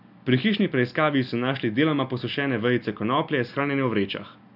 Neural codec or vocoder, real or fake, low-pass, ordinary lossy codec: none; real; 5.4 kHz; none